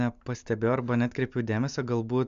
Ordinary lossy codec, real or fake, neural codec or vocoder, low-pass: AAC, 96 kbps; real; none; 7.2 kHz